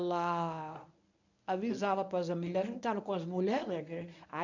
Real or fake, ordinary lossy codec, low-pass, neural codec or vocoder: fake; none; 7.2 kHz; codec, 24 kHz, 0.9 kbps, WavTokenizer, medium speech release version 1